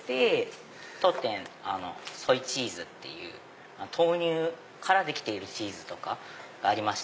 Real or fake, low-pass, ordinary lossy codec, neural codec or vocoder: real; none; none; none